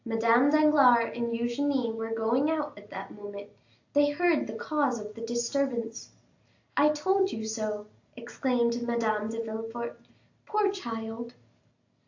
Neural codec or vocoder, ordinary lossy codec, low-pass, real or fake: none; AAC, 48 kbps; 7.2 kHz; real